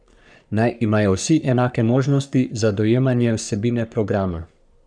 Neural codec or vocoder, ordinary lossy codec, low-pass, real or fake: codec, 44.1 kHz, 3.4 kbps, Pupu-Codec; none; 9.9 kHz; fake